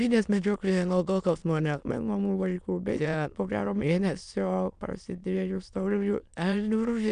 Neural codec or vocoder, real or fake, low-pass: autoencoder, 22.05 kHz, a latent of 192 numbers a frame, VITS, trained on many speakers; fake; 9.9 kHz